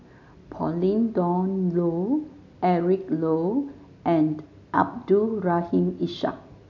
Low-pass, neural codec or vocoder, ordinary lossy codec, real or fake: 7.2 kHz; none; none; real